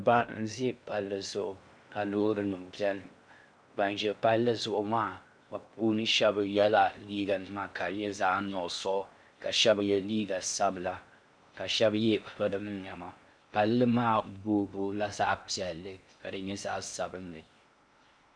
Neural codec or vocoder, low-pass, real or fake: codec, 16 kHz in and 24 kHz out, 0.6 kbps, FocalCodec, streaming, 2048 codes; 9.9 kHz; fake